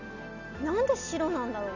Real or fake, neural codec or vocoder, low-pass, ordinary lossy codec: real; none; 7.2 kHz; none